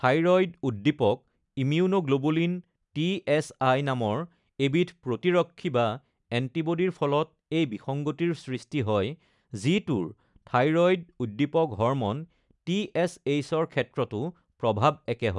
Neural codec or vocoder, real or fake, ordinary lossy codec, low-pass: none; real; none; 10.8 kHz